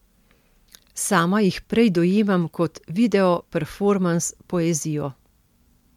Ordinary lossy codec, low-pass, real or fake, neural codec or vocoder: MP3, 96 kbps; 19.8 kHz; real; none